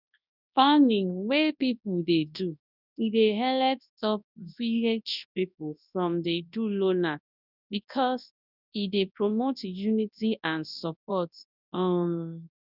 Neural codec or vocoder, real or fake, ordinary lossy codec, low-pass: codec, 24 kHz, 0.9 kbps, WavTokenizer, large speech release; fake; none; 5.4 kHz